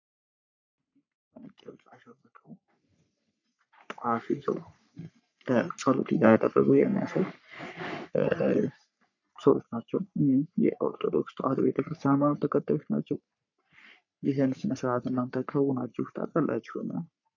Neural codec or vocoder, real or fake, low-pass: codec, 44.1 kHz, 3.4 kbps, Pupu-Codec; fake; 7.2 kHz